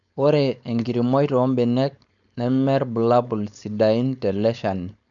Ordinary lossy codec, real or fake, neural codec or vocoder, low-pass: none; fake; codec, 16 kHz, 4.8 kbps, FACodec; 7.2 kHz